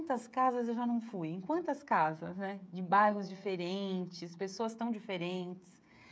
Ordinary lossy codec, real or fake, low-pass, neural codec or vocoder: none; fake; none; codec, 16 kHz, 16 kbps, FreqCodec, smaller model